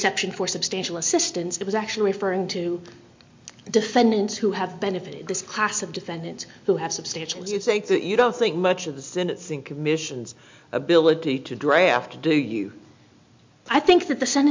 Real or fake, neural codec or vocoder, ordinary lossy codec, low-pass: real; none; MP3, 48 kbps; 7.2 kHz